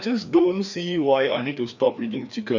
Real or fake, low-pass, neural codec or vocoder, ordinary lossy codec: fake; 7.2 kHz; codec, 16 kHz, 2 kbps, FreqCodec, larger model; none